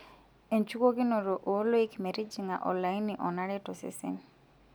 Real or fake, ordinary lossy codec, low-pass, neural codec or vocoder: real; none; none; none